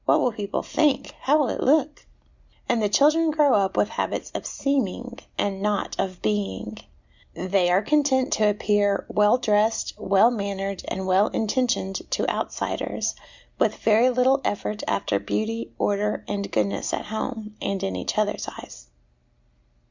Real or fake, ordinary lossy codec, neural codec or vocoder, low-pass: real; Opus, 64 kbps; none; 7.2 kHz